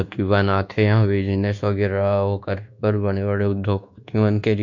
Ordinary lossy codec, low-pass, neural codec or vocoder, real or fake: none; 7.2 kHz; codec, 24 kHz, 1.2 kbps, DualCodec; fake